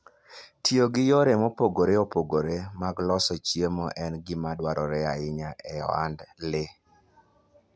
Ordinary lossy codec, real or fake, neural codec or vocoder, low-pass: none; real; none; none